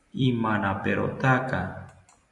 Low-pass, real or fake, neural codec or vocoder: 10.8 kHz; fake; vocoder, 44.1 kHz, 128 mel bands every 256 samples, BigVGAN v2